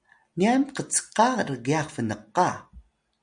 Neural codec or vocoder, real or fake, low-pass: none; real; 9.9 kHz